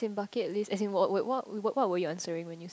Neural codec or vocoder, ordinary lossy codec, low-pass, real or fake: none; none; none; real